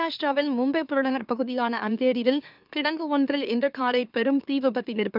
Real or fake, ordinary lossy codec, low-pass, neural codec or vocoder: fake; none; 5.4 kHz; autoencoder, 44.1 kHz, a latent of 192 numbers a frame, MeloTTS